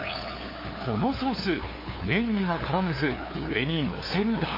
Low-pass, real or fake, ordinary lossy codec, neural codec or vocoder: 5.4 kHz; fake; MP3, 24 kbps; codec, 16 kHz, 2 kbps, FunCodec, trained on LibriTTS, 25 frames a second